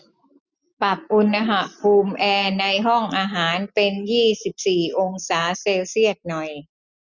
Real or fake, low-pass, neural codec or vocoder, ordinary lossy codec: real; 7.2 kHz; none; none